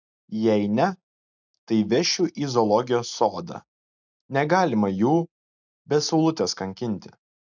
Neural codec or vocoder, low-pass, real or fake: none; 7.2 kHz; real